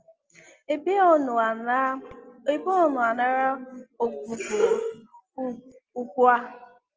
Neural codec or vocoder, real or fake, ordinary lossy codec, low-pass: none; real; Opus, 24 kbps; 7.2 kHz